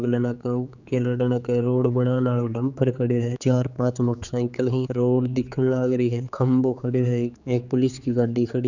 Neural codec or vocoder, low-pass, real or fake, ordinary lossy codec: codec, 16 kHz, 4 kbps, X-Codec, HuBERT features, trained on general audio; 7.2 kHz; fake; none